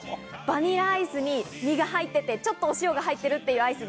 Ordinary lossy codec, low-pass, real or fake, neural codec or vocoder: none; none; real; none